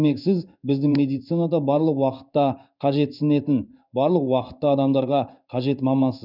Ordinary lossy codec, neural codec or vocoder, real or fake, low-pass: none; codec, 16 kHz in and 24 kHz out, 1 kbps, XY-Tokenizer; fake; 5.4 kHz